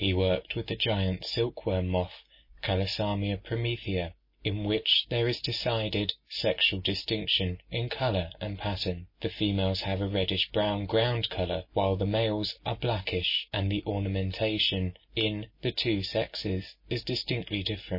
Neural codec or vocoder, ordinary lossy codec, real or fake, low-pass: none; MP3, 24 kbps; real; 5.4 kHz